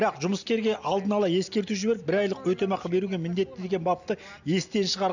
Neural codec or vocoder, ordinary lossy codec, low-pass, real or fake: none; none; 7.2 kHz; real